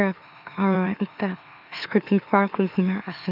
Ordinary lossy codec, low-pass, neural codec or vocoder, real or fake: none; 5.4 kHz; autoencoder, 44.1 kHz, a latent of 192 numbers a frame, MeloTTS; fake